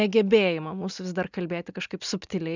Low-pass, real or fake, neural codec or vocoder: 7.2 kHz; real; none